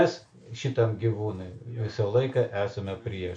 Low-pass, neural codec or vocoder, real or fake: 9.9 kHz; none; real